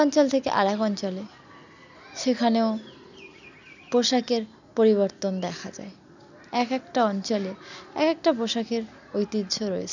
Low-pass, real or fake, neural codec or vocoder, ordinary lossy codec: 7.2 kHz; real; none; none